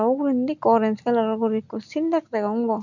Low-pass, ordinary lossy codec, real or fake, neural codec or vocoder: 7.2 kHz; none; real; none